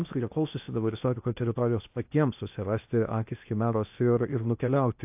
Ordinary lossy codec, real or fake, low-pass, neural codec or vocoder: AAC, 32 kbps; fake; 3.6 kHz; codec, 16 kHz in and 24 kHz out, 0.8 kbps, FocalCodec, streaming, 65536 codes